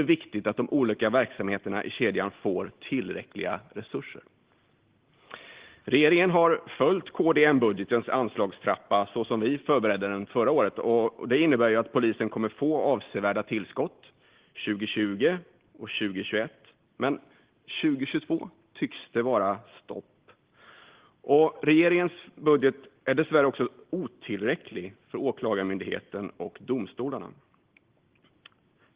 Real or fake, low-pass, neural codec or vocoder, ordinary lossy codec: real; 3.6 kHz; none; Opus, 16 kbps